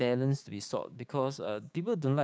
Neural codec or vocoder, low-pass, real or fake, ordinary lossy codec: codec, 16 kHz, 6 kbps, DAC; none; fake; none